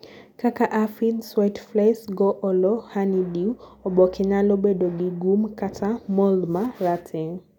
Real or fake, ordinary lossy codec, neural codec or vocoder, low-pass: real; none; none; 19.8 kHz